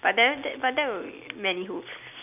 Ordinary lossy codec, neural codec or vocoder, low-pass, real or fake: none; none; 3.6 kHz; real